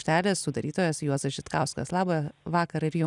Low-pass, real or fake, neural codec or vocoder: 10.8 kHz; real; none